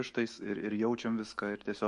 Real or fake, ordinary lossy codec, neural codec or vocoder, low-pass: fake; MP3, 48 kbps; autoencoder, 48 kHz, 128 numbers a frame, DAC-VAE, trained on Japanese speech; 14.4 kHz